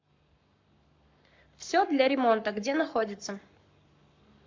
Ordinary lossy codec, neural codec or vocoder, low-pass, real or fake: MP3, 64 kbps; codec, 44.1 kHz, 7.8 kbps, Pupu-Codec; 7.2 kHz; fake